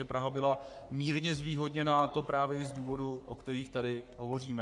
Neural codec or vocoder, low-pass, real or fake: codec, 44.1 kHz, 3.4 kbps, Pupu-Codec; 10.8 kHz; fake